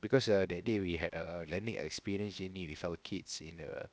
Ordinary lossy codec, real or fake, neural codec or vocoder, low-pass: none; fake; codec, 16 kHz, 0.7 kbps, FocalCodec; none